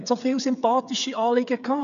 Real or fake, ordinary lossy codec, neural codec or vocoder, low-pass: fake; none; codec, 16 kHz, 8 kbps, FreqCodec, smaller model; 7.2 kHz